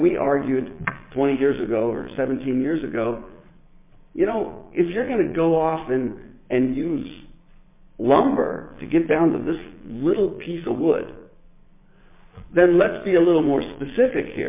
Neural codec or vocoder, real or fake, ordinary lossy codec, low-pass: vocoder, 22.05 kHz, 80 mel bands, Vocos; fake; MP3, 24 kbps; 3.6 kHz